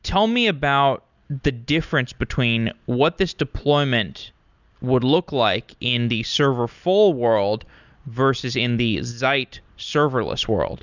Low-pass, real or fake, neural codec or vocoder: 7.2 kHz; real; none